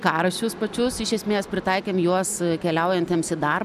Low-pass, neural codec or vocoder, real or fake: 14.4 kHz; none; real